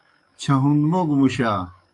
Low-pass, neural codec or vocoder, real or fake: 10.8 kHz; codec, 44.1 kHz, 7.8 kbps, DAC; fake